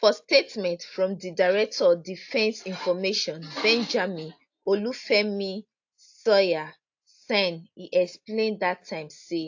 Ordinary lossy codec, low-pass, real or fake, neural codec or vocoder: AAC, 48 kbps; 7.2 kHz; real; none